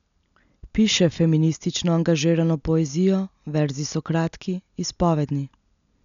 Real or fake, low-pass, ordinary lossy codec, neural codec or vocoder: real; 7.2 kHz; none; none